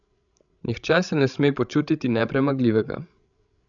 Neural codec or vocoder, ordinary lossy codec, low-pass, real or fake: codec, 16 kHz, 16 kbps, FreqCodec, larger model; none; 7.2 kHz; fake